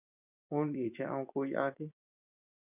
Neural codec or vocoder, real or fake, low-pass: vocoder, 24 kHz, 100 mel bands, Vocos; fake; 3.6 kHz